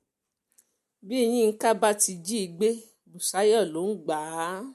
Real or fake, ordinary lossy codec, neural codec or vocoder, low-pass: real; MP3, 64 kbps; none; 14.4 kHz